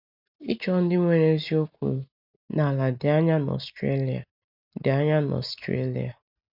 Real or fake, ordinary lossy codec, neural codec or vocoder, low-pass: real; none; none; 5.4 kHz